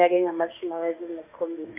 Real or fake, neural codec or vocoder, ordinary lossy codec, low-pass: fake; autoencoder, 48 kHz, 32 numbers a frame, DAC-VAE, trained on Japanese speech; MP3, 32 kbps; 3.6 kHz